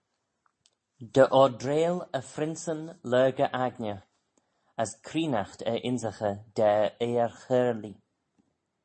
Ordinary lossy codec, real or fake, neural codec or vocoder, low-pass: MP3, 32 kbps; real; none; 9.9 kHz